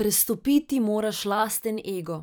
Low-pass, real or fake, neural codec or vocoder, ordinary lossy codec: none; fake; vocoder, 44.1 kHz, 128 mel bands every 512 samples, BigVGAN v2; none